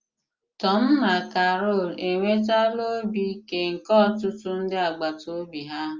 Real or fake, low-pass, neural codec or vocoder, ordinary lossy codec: real; 7.2 kHz; none; Opus, 32 kbps